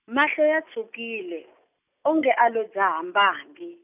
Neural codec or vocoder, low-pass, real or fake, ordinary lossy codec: none; 3.6 kHz; real; none